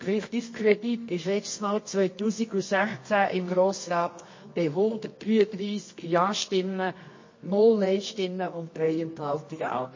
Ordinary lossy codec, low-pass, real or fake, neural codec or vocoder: MP3, 32 kbps; 7.2 kHz; fake; codec, 24 kHz, 0.9 kbps, WavTokenizer, medium music audio release